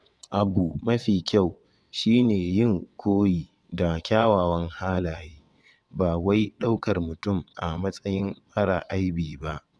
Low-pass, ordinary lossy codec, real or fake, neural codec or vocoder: none; none; fake; vocoder, 22.05 kHz, 80 mel bands, WaveNeXt